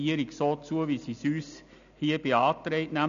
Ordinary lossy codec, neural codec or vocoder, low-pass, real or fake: none; none; 7.2 kHz; real